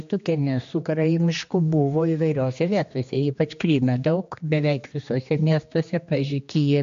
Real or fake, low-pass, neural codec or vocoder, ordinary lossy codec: fake; 7.2 kHz; codec, 16 kHz, 2 kbps, X-Codec, HuBERT features, trained on general audio; MP3, 48 kbps